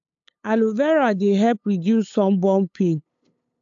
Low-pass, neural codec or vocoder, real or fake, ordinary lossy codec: 7.2 kHz; codec, 16 kHz, 8 kbps, FunCodec, trained on LibriTTS, 25 frames a second; fake; AAC, 64 kbps